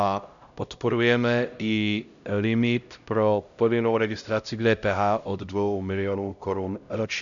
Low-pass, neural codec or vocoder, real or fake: 7.2 kHz; codec, 16 kHz, 0.5 kbps, X-Codec, HuBERT features, trained on LibriSpeech; fake